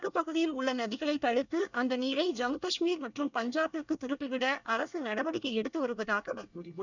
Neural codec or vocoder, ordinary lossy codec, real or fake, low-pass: codec, 24 kHz, 1 kbps, SNAC; none; fake; 7.2 kHz